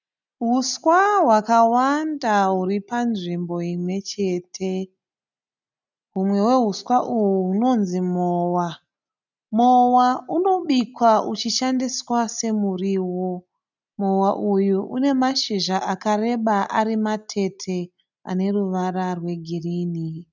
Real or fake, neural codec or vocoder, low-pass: real; none; 7.2 kHz